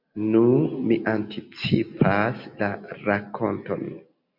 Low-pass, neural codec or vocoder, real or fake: 5.4 kHz; none; real